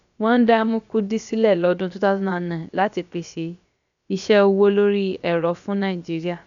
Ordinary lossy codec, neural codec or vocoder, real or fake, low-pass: none; codec, 16 kHz, about 1 kbps, DyCAST, with the encoder's durations; fake; 7.2 kHz